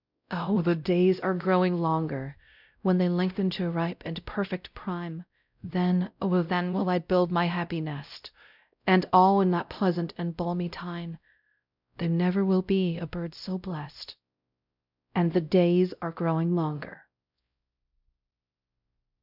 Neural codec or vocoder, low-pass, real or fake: codec, 16 kHz, 0.5 kbps, X-Codec, WavLM features, trained on Multilingual LibriSpeech; 5.4 kHz; fake